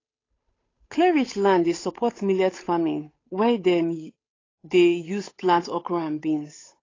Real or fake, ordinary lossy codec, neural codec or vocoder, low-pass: fake; AAC, 32 kbps; codec, 16 kHz, 8 kbps, FunCodec, trained on Chinese and English, 25 frames a second; 7.2 kHz